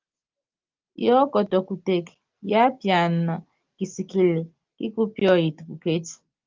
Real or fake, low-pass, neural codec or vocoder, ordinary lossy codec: real; 7.2 kHz; none; Opus, 32 kbps